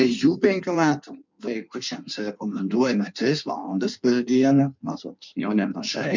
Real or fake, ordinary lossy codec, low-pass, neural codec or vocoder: fake; MP3, 64 kbps; 7.2 kHz; codec, 16 kHz in and 24 kHz out, 1.1 kbps, FireRedTTS-2 codec